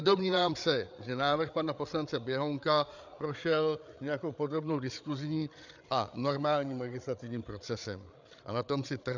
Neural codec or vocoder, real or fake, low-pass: codec, 16 kHz, 8 kbps, FreqCodec, larger model; fake; 7.2 kHz